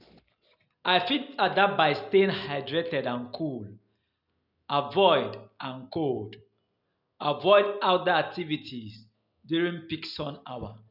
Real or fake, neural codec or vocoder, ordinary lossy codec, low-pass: real; none; none; 5.4 kHz